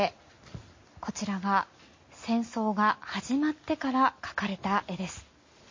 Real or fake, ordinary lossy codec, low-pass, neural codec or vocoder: real; MP3, 32 kbps; 7.2 kHz; none